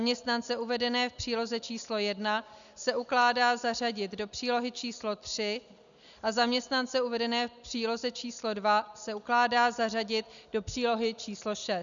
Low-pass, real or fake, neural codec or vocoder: 7.2 kHz; real; none